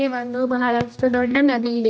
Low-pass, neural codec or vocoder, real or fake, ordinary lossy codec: none; codec, 16 kHz, 1 kbps, X-Codec, HuBERT features, trained on general audio; fake; none